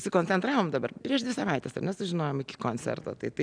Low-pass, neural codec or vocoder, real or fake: 9.9 kHz; none; real